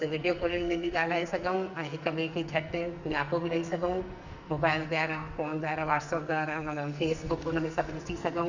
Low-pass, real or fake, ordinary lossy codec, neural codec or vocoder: 7.2 kHz; fake; Opus, 64 kbps; codec, 44.1 kHz, 2.6 kbps, SNAC